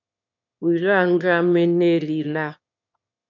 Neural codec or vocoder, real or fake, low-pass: autoencoder, 22.05 kHz, a latent of 192 numbers a frame, VITS, trained on one speaker; fake; 7.2 kHz